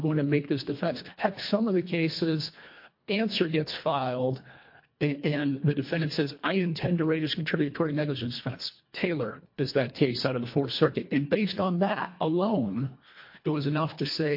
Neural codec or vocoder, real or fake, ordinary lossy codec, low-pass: codec, 24 kHz, 1.5 kbps, HILCodec; fake; MP3, 32 kbps; 5.4 kHz